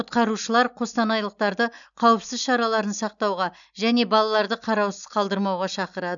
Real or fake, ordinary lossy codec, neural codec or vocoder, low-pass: real; none; none; 7.2 kHz